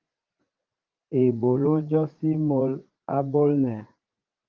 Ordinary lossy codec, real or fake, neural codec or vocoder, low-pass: Opus, 32 kbps; fake; vocoder, 44.1 kHz, 80 mel bands, Vocos; 7.2 kHz